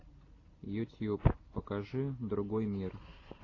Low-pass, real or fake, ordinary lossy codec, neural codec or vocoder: 7.2 kHz; real; AAC, 32 kbps; none